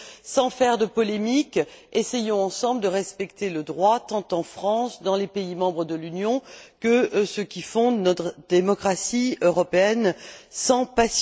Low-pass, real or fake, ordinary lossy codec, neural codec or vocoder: none; real; none; none